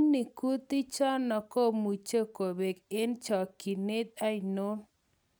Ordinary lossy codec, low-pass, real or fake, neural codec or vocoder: none; none; real; none